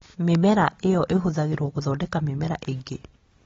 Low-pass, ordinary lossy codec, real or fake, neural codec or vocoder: 7.2 kHz; AAC, 24 kbps; real; none